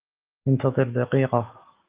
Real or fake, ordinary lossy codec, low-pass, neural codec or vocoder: fake; Opus, 32 kbps; 3.6 kHz; autoencoder, 48 kHz, 128 numbers a frame, DAC-VAE, trained on Japanese speech